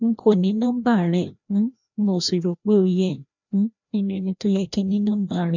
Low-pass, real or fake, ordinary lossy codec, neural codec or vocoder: 7.2 kHz; fake; none; codec, 16 kHz, 1 kbps, FreqCodec, larger model